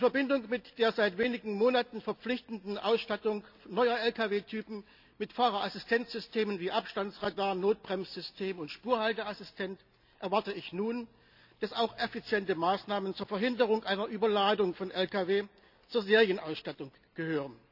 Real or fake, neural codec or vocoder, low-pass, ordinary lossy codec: real; none; 5.4 kHz; none